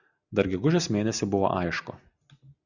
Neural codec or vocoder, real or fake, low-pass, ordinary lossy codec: none; real; 7.2 kHz; Opus, 64 kbps